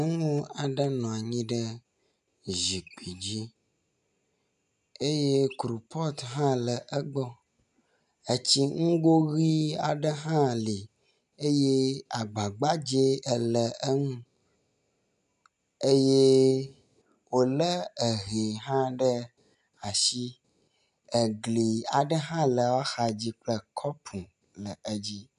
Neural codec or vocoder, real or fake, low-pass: none; real; 10.8 kHz